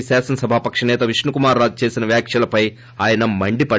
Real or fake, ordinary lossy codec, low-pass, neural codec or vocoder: real; none; none; none